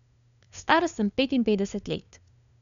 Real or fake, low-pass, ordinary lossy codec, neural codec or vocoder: fake; 7.2 kHz; none; codec, 16 kHz, 2 kbps, FunCodec, trained on LibriTTS, 25 frames a second